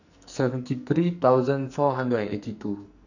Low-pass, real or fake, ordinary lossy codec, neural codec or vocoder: 7.2 kHz; fake; none; codec, 44.1 kHz, 2.6 kbps, SNAC